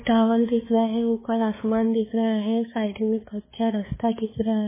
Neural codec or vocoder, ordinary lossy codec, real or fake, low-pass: codec, 16 kHz, 2 kbps, X-Codec, HuBERT features, trained on balanced general audio; MP3, 16 kbps; fake; 3.6 kHz